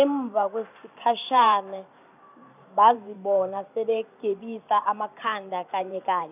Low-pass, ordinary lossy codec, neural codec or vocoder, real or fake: 3.6 kHz; none; vocoder, 44.1 kHz, 128 mel bands every 512 samples, BigVGAN v2; fake